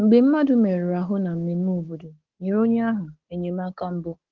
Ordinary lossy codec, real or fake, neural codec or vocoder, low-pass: Opus, 16 kbps; fake; codec, 16 kHz, 6 kbps, DAC; 7.2 kHz